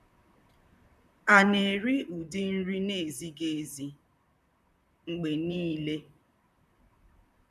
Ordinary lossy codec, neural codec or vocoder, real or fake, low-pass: none; vocoder, 44.1 kHz, 128 mel bands, Pupu-Vocoder; fake; 14.4 kHz